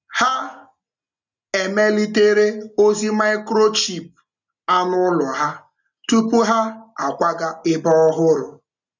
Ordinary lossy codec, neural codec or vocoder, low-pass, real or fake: none; none; 7.2 kHz; real